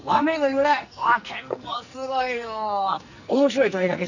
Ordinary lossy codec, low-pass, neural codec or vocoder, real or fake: none; 7.2 kHz; codec, 44.1 kHz, 2.6 kbps, SNAC; fake